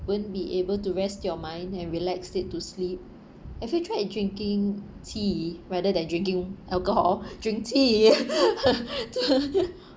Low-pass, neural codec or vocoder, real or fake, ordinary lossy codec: none; none; real; none